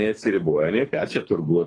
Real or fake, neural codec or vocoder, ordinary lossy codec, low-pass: fake; codec, 24 kHz, 3 kbps, HILCodec; AAC, 32 kbps; 9.9 kHz